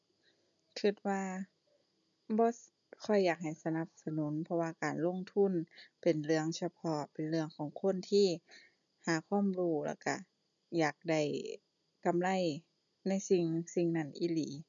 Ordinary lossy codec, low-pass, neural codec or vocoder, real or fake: none; 7.2 kHz; none; real